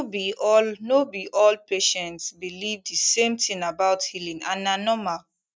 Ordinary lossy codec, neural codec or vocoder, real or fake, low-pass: none; none; real; none